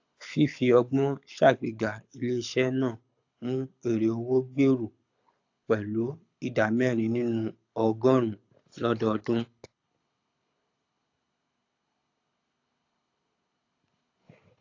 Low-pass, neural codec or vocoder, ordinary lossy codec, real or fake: 7.2 kHz; codec, 24 kHz, 6 kbps, HILCodec; AAC, 48 kbps; fake